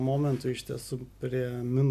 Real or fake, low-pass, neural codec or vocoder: real; 14.4 kHz; none